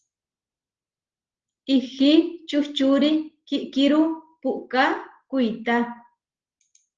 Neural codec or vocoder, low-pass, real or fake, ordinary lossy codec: none; 7.2 kHz; real; Opus, 16 kbps